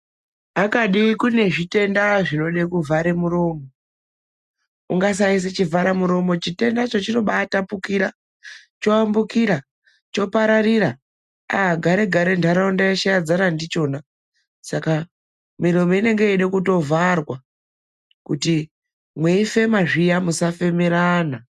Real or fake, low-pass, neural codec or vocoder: real; 14.4 kHz; none